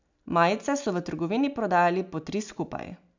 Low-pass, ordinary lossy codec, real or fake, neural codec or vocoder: 7.2 kHz; none; real; none